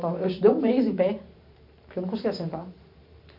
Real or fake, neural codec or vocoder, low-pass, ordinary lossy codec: fake; vocoder, 44.1 kHz, 128 mel bands every 512 samples, BigVGAN v2; 5.4 kHz; MP3, 48 kbps